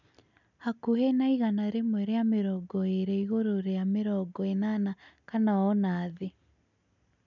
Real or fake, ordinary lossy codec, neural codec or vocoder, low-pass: real; none; none; 7.2 kHz